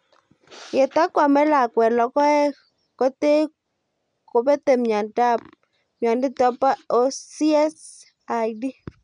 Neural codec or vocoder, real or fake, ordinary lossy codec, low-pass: none; real; none; 9.9 kHz